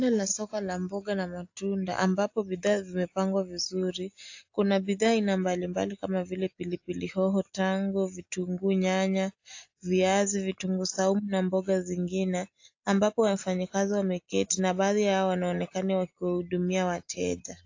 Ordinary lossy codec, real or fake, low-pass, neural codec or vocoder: AAC, 48 kbps; real; 7.2 kHz; none